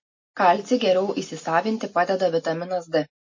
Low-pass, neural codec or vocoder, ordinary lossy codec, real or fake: 7.2 kHz; none; MP3, 32 kbps; real